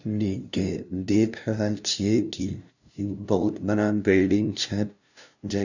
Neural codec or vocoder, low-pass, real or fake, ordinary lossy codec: codec, 16 kHz, 0.5 kbps, FunCodec, trained on LibriTTS, 25 frames a second; 7.2 kHz; fake; none